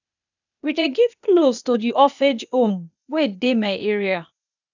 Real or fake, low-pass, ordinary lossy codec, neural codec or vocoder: fake; 7.2 kHz; none; codec, 16 kHz, 0.8 kbps, ZipCodec